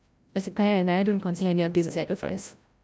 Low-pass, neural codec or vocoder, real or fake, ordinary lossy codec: none; codec, 16 kHz, 0.5 kbps, FreqCodec, larger model; fake; none